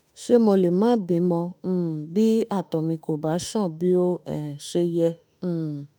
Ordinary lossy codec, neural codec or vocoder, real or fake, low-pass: none; autoencoder, 48 kHz, 32 numbers a frame, DAC-VAE, trained on Japanese speech; fake; none